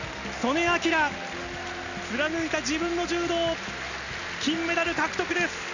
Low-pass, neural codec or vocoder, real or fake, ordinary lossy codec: 7.2 kHz; none; real; none